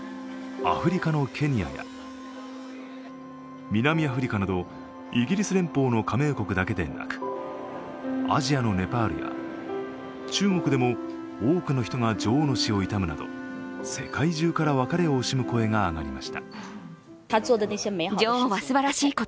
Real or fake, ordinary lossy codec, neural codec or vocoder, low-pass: real; none; none; none